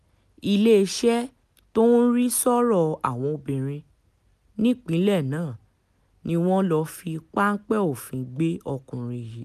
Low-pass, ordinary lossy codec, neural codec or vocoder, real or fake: 14.4 kHz; none; none; real